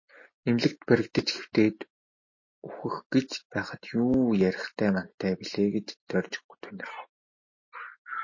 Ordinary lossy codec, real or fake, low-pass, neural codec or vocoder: MP3, 32 kbps; real; 7.2 kHz; none